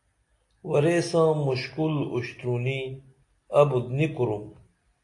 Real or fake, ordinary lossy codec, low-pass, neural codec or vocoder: real; AAC, 48 kbps; 10.8 kHz; none